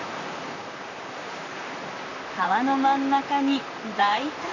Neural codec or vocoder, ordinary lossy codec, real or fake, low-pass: vocoder, 44.1 kHz, 128 mel bands, Pupu-Vocoder; none; fake; 7.2 kHz